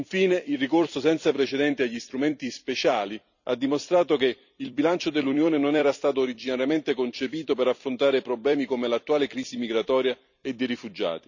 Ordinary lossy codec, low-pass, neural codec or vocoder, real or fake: none; 7.2 kHz; vocoder, 44.1 kHz, 128 mel bands every 256 samples, BigVGAN v2; fake